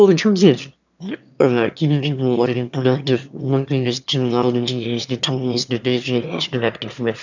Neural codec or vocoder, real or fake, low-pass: autoencoder, 22.05 kHz, a latent of 192 numbers a frame, VITS, trained on one speaker; fake; 7.2 kHz